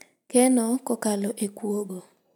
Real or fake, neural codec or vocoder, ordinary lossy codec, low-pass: real; none; none; none